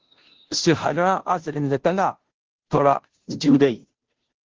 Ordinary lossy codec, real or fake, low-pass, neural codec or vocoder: Opus, 16 kbps; fake; 7.2 kHz; codec, 16 kHz, 0.5 kbps, FunCodec, trained on Chinese and English, 25 frames a second